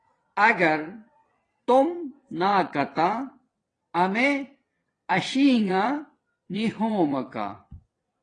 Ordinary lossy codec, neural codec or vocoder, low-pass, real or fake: AAC, 32 kbps; vocoder, 22.05 kHz, 80 mel bands, WaveNeXt; 9.9 kHz; fake